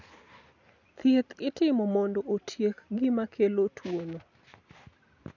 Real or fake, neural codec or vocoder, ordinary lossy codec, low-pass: real; none; none; 7.2 kHz